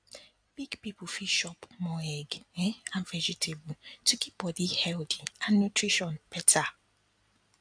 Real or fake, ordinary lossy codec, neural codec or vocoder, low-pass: real; AAC, 64 kbps; none; 9.9 kHz